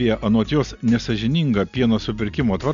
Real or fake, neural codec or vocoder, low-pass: real; none; 7.2 kHz